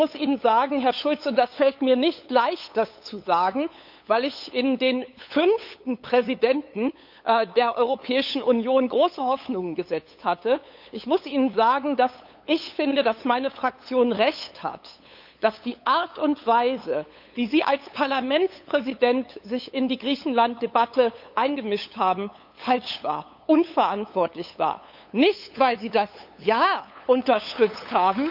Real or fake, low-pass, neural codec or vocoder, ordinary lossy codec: fake; 5.4 kHz; codec, 16 kHz, 8 kbps, FunCodec, trained on LibriTTS, 25 frames a second; none